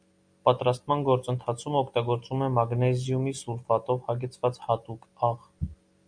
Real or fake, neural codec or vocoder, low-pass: real; none; 9.9 kHz